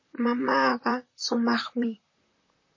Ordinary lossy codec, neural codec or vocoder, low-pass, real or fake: MP3, 32 kbps; vocoder, 44.1 kHz, 128 mel bands, Pupu-Vocoder; 7.2 kHz; fake